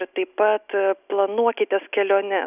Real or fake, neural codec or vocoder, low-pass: real; none; 3.6 kHz